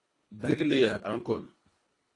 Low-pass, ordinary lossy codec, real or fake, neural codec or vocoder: 10.8 kHz; AAC, 32 kbps; fake; codec, 24 kHz, 1.5 kbps, HILCodec